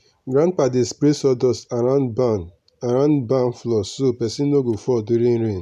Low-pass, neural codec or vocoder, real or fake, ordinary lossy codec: 14.4 kHz; none; real; none